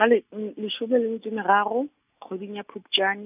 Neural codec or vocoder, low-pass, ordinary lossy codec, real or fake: none; 3.6 kHz; none; real